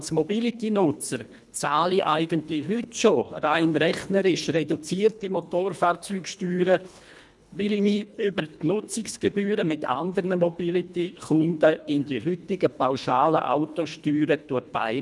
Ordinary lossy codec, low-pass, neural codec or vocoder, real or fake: none; none; codec, 24 kHz, 1.5 kbps, HILCodec; fake